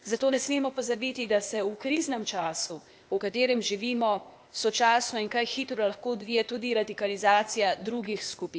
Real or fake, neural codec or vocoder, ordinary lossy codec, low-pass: fake; codec, 16 kHz, 0.8 kbps, ZipCodec; none; none